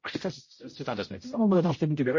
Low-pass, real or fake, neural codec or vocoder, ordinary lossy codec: 7.2 kHz; fake; codec, 16 kHz, 0.5 kbps, X-Codec, HuBERT features, trained on general audio; MP3, 32 kbps